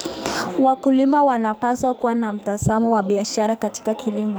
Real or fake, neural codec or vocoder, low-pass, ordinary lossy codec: fake; codec, 44.1 kHz, 2.6 kbps, SNAC; none; none